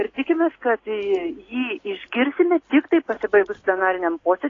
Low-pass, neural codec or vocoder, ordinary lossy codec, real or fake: 7.2 kHz; none; AAC, 32 kbps; real